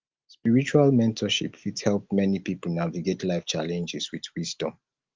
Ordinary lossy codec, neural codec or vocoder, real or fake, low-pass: Opus, 24 kbps; none; real; 7.2 kHz